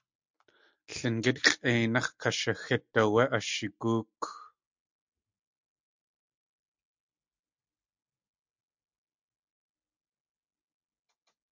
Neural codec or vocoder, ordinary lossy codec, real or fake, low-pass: none; MP3, 64 kbps; real; 7.2 kHz